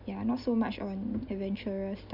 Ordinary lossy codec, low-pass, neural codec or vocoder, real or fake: none; 5.4 kHz; none; real